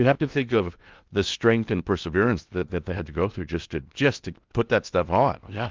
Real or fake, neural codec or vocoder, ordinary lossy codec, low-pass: fake; codec, 16 kHz in and 24 kHz out, 0.8 kbps, FocalCodec, streaming, 65536 codes; Opus, 32 kbps; 7.2 kHz